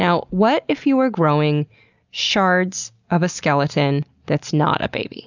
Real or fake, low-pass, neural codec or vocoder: real; 7.2 kHz; none